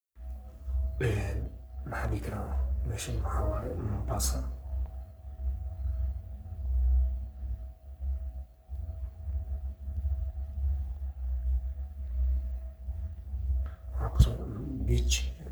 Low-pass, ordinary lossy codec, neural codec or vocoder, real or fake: none; none; codec, 44.1 kHz, 3.4 kbps, Pupu-Codec; fake